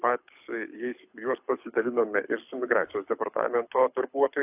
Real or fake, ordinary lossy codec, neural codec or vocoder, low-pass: fake; AAC, 32 kbps; codec, 24 kHz, 6 kbps, HILCodec; 3.6 kHz